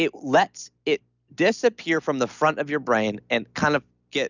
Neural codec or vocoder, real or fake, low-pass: none; real; 7.2 kHz